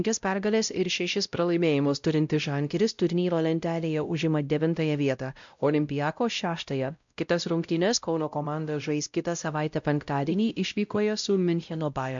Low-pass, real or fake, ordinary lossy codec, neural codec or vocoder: 7.2 kHz; fake; MP3, 64 kbps; codec, 16 kHz, 0.5 kbps, X-Codec, WavLM features, trained on Multilingual LibriSpeech